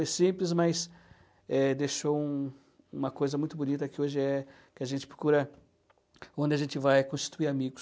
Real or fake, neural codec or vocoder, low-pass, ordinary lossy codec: real; none; none; none